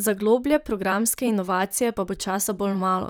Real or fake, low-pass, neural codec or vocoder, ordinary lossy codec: fake; none; vocoder, 44.1 kHz, 128 mel bands, Pupu-Vocoder; none